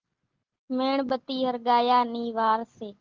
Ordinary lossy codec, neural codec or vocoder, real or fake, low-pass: Opus, 16 kbps; none; real; 7.2 kHz